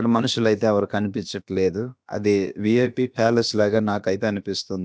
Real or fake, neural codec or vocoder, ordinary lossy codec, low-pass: fake; codec, 16 kHz, about 1 kbps, DyCAST, with the encoder's durations; none; none